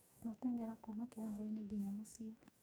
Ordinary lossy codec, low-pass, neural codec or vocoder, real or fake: none; none; codec, 44.1 kHz, 2.6 kbps, DAC; fake